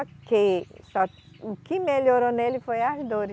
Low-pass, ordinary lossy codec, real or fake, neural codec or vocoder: none; none; real; none